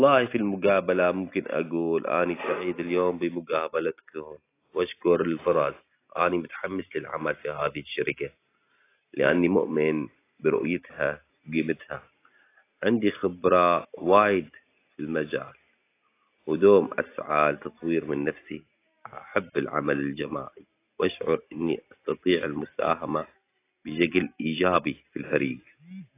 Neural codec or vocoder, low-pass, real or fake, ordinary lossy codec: none; 3.6 kHz; real; AAC, 24 kbps